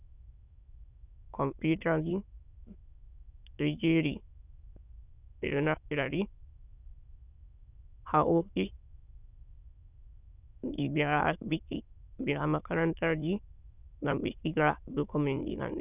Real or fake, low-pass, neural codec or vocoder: fake; 3.6 kHz; autoencoder, 22.05 kHz, a latent of 192 numbers a frame, VITS, trained on many speakers